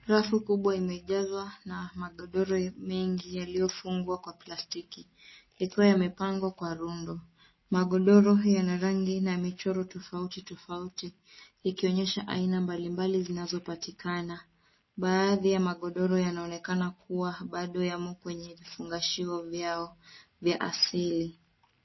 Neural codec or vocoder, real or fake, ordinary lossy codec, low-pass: none; real; MP3, 24 kbps; 7.2 kHz